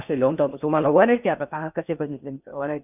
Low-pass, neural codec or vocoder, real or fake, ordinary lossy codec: 3.6 kHz; codec, 16 kHz in and 24 kHz out, 0.6 kbps, FocalCodec, streaming, 2048 codes; fake; none